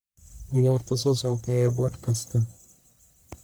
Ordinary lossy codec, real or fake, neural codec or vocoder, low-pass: none; fake; codec, 44.1 kHz, 1.7 kbps, Pupu-Codec; none